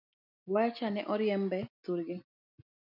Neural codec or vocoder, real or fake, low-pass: none; real; 5.4 kHz